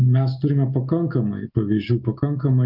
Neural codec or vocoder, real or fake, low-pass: none; real; 5.4 kHz